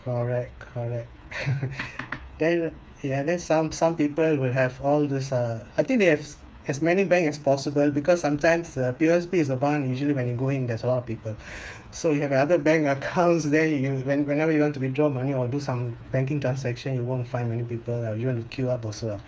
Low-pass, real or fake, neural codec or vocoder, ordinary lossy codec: none; fake; codec, 16 kHz, 4 kbps, FreqCodec, smaller model; none